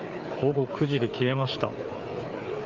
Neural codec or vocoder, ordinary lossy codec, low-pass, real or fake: codec, 16 kHz, 4 kbps, FreqCodec, larger model; Opus, 32 kbps; 7.2 kHz; fake